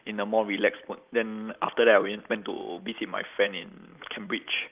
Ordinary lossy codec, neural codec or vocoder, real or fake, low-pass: Opus, 24 kbps; none; real; 3.6 kHz